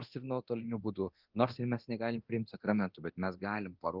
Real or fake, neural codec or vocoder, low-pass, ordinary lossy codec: fake; codec, 24 kHz, 0.9 kbps, DualCodec; 5.4 kHz; Opus, 32 kbps